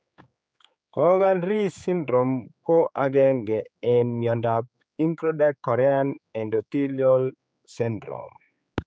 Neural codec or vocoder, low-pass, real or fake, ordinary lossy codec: codec, 16 kHz, 4 kbps, X-Codec, HuBERT features, trained on general audio; none; fake; none